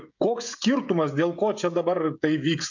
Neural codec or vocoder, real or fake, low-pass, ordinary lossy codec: codec, 16 kHz, 16 kbps, FreqCodec, smaller model; fake; 7.2 kHz; MP3, 64 kbps